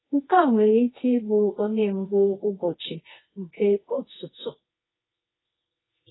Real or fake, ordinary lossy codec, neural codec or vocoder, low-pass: fake; AAC, 16 kbps; codec, 24 kHz, 0.9 kbps, WavTokenizer, medium music audio release; 7.2 kHz